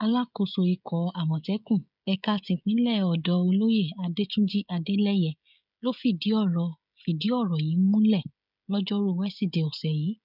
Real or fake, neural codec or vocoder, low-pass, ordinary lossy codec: fake; codec, 16 kHz, 16 kbps, FreqCodec, smaller model; 5.4 kHz; none